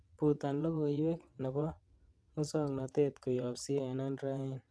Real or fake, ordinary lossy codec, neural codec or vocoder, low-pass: fake; none; vocoder, 22.05 kHz, 80 mel bands, WaveNeXt; none